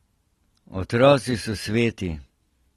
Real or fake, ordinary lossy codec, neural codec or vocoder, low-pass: real; AAC, 32 kbps; none; 19.8 kHz